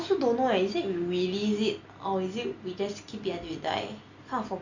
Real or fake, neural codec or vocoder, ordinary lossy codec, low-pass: real; none; Opus, 64 kbps; 7.2 kHz